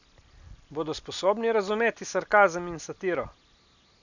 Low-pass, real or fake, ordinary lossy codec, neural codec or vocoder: 7.2 kHz; real; none; none